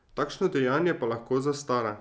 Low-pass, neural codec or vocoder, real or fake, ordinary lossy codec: none; none; real; none